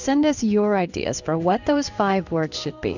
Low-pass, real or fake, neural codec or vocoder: 7.2 kHz; fake; codec, 16 kHz in and 24 kHz out, 1 kbps, XY-Tokenizer